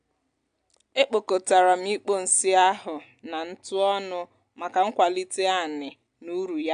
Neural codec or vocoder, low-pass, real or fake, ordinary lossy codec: none; 9.9 kHz; real; AAC, 64 kbps